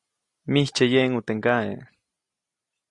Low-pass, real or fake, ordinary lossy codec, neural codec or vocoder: 10.8 kHz; real; Opus, 64 kbps; none